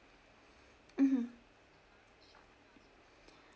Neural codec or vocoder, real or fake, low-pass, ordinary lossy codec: none; real; none; none